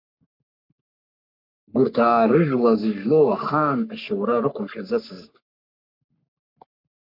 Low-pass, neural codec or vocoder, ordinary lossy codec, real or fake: 5.4 kHz; codec, 44.1 kHz, 3.4 kbps, Pupu-Codec; MP3, 48 kbps; fake